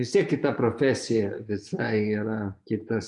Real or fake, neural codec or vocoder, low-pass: fake; vocoder, 24 kHz, 100 mel bands, Vocos; 10.8 kHz